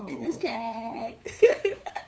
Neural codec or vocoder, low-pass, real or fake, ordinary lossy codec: codec, 16 kHz, 2 kbps, FunCodec, trained on LibriTTS, 25 frames a second; none; fake; none